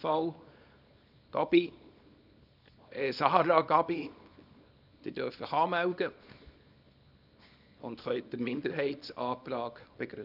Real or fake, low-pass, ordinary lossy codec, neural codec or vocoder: fake; 5.4 kHz; none; codec, 24 kHz, 0.9 kbps, WavTokenizer, small release